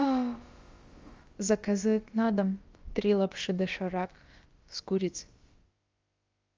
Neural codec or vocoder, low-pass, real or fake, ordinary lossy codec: codec, 16 kHz, about 1 kbps, DyCAST, with the encoder's durations; 7.2 kHz; fake; Opus, 32 kbps